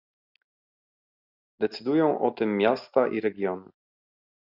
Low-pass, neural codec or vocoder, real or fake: 5.4 kHz; none; real